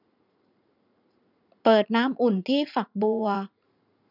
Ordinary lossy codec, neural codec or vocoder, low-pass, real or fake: none; vocoder, 44.1 kHz, 80 mel bands, Vocos; 5.4 kHz; fake